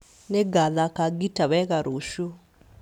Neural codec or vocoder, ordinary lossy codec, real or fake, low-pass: none; none; real; 19.8 kHz